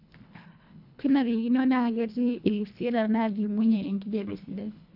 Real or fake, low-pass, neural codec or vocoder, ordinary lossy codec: fake; 5.4 kHz; codec, 24 kHz, 1.5 kbps, HILCodec; none